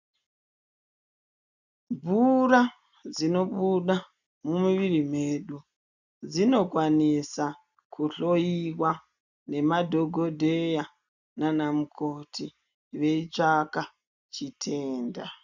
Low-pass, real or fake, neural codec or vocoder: 7.2 kHz; real; none